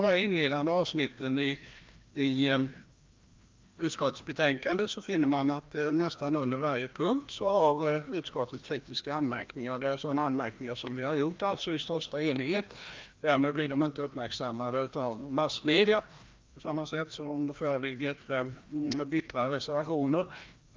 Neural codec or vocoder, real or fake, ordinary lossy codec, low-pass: codec, 16 kHz, 1 kbps, FreqCodec, larger model; fake; Opus, 24 kbps; 7.2 kHz